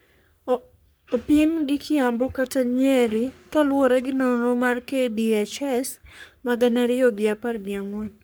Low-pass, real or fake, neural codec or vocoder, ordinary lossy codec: none; fake; codec, 44.1 kHz, 3.4 kbps, Pupu-Codec; none